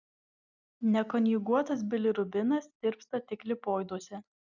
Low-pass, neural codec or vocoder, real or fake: 7.2 kHz; none; real